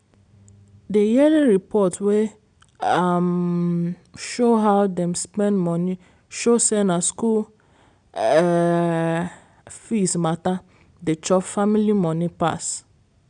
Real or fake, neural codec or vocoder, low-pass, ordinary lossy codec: real; none; 9.9 kHz; none